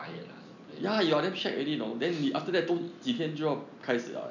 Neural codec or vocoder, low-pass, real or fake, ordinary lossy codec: none; 7.2 kHz; real; none